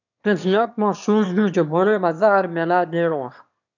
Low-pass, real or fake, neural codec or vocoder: 7.2 kHz; fake; autoencoder, 22.05 kHz, a latent of 192 numbers a frame, VITS, trained on one speaker